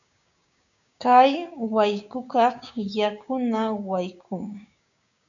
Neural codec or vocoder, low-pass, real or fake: codec, 16 kHz, 6 kbps, DAC; 7.2 kHz; fake